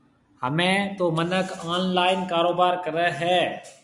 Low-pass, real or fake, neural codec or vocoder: 10.8 kHz; real; none